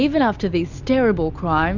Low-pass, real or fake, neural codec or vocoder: 7.2 kHz; real; none